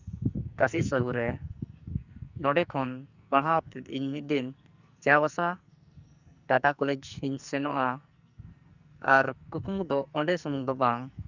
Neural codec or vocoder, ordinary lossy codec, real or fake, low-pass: codec, 44.1 kHz, 2.6 kbps, SNAC; Opus, 64 kbps; fake; 7.2 kHz